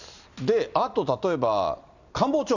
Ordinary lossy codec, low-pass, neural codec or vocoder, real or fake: none; 7.2 kHz; none; real